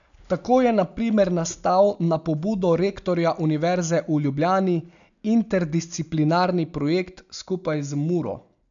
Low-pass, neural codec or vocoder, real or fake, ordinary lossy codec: 7.2 kHz; none; real; MP3, 96 kbps